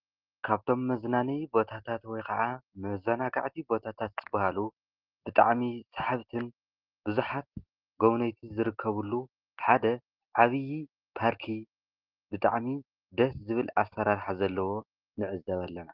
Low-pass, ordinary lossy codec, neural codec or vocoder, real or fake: 5.4 kHz; Opus, 16 kbps; none; real